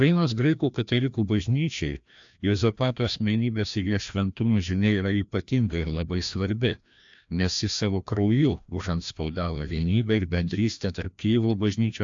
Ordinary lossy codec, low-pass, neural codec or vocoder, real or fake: AAC, 64 kbps; 7.2 kHz; codec, 16 kHz, 1 kbps, FreqCodec, larger model; fake